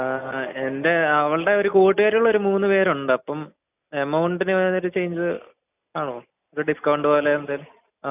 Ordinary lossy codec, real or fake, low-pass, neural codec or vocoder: none; real; 3.6 kHz; none